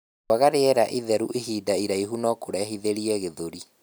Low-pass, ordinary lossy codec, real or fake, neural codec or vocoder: none; none; real; none